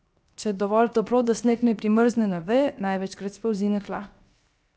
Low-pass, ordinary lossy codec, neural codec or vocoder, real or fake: none; none; codec, 16 kHz, 0.7 kbps, FocalCodec; fake